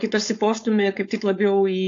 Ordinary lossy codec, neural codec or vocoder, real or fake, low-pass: AAC, 48 kbps; codec, 16 kHz, 4.8 kbps, FACodec; fake; 7.2 kHz